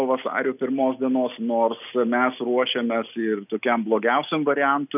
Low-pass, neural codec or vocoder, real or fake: 3.6 kHz; none; real